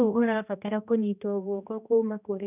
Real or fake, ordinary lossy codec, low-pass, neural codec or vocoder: fake; none; 3.6 kHz; codec, 16 kHz, 1 kbps, X-Codec, HuBERT features, trained on general audio